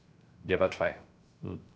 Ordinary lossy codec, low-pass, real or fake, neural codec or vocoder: none; none; fake; codec, 16 kHz, 0.3 kbps, FocalCodec